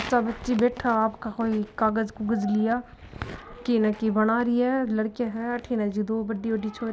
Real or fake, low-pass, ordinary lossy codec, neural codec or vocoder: real; none; none; none